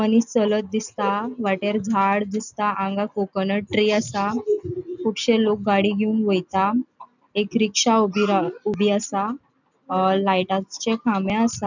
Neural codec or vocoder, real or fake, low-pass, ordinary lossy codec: none; real; 7.2 kHz; none